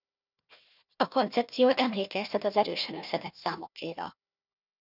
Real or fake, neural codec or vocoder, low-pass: fake; codec, 16 kHz, 1 kbps, FunCodec, trained on Chinese and English, 50 frames a second; 5.4 kHz